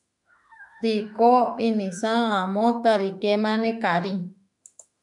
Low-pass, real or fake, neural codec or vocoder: 10.8 kHz; fake; autoencoder, 48 kHz, 32 numbers a frame, DAC-VAE, trained on Japanese speech